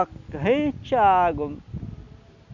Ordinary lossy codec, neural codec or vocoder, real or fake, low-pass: none; none; real; 7.2 kHz